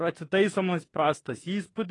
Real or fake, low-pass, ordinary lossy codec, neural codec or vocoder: fake; 10.8 kHz; AAC, 32 kbps; autoencoder, 48 kHz, 32 numbers a frame, DAC-VAE, trained on Japanese speech